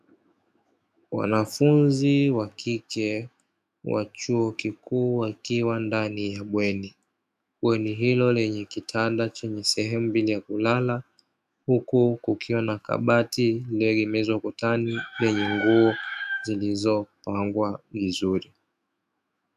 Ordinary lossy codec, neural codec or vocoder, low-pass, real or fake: MP3, 96 kbps; autoencoder, 48 kHz, 128 numbers a frame, DAC-VAE, trained on Japanese speech; 14.4 kHz; fake